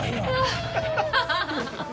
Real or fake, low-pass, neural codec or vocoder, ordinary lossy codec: real; none; none; none